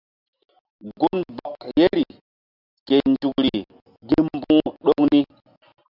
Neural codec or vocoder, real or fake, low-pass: none; real; 5.4 kHz